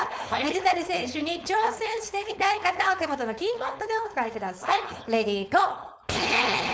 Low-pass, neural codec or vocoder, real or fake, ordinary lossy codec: none; codec, 16 kHz, 4.8 kbps, FACodec; fake; none